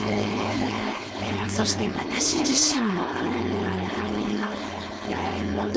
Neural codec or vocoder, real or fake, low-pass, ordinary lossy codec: codec, 16 kHz, 4.8 kbps, FACodec; fake; none; none